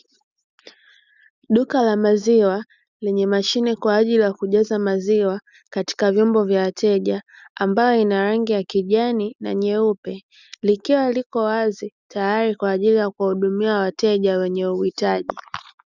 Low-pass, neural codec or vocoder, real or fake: 7.2 kHz; none; real